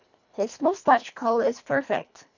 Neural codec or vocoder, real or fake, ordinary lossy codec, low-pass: codec, 24 kHz, 1.5 kbps, HILCodec; fake; none; 7.2 kHz